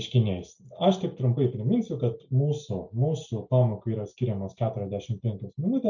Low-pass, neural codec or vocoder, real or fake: 7.2 kHz; none; real